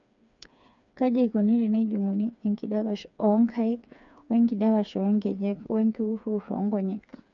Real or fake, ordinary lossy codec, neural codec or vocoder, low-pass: fake; none; codec, 16 kHz, 4 kbps, FreqCodec, smaller model; 7.2 kHz